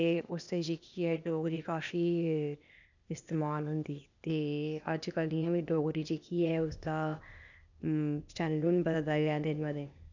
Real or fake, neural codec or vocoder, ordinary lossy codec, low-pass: fake; codec, 16 kHz, 0.8 kbps, ZipCodec; none; 7.2 kHz